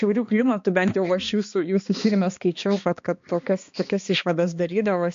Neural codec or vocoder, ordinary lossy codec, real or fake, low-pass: codec, 16 kHz, 2 kbps, X-Codec, HuBERT features, trained on balanced general audio; MP3, 48 kbps; fake; 7.2 kHz